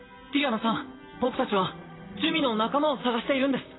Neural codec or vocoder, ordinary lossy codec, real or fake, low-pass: vocoder, 44.1 kHz, 128 mel bands, Pupu-Vocoder; AAC, 16 kbps; fake; 7.2 kHz